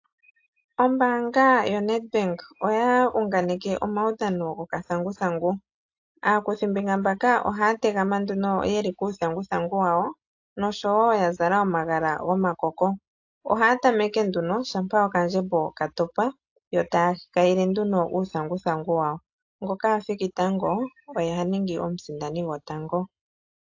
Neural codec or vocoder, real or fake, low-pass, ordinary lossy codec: none; real; 7.2 kHz; AAC, 48 kbps